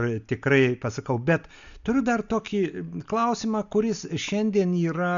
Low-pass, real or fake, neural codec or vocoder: 7.2 kHz; real; none